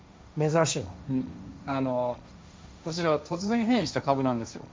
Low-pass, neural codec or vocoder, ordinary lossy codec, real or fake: none; codec, 16 kHz, 1.1 kbps, Voila-Tokenizer; none; fake